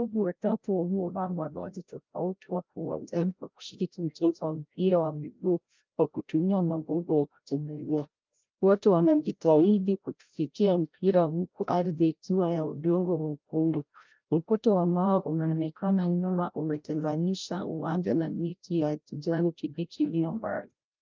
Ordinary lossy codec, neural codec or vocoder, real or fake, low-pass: Opus, 24 kbps; codec, 16 kHz, 0.5 kbps, FreqCodec, larger model; fake; 7.2 kHz